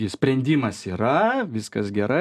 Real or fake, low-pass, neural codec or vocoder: real; 14.4 kHz; none